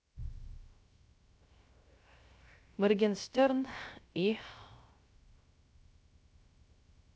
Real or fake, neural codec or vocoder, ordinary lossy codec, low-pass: fake; codec, 16 kHz, 0.3 kbps, FocalCodec; none; none